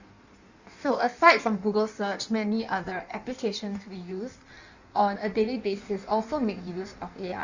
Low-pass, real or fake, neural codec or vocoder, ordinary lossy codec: 7.2 kHz; fake; codec, 16 kHz in and 24 kHz out, 1.1 kbps, FireRedTTS-2 codec; Opus, 64 kbps